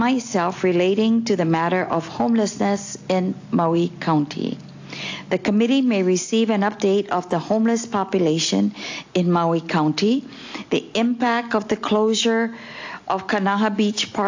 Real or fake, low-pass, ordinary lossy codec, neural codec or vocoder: real; 7.2 kHz; AAC, 48 kbps; none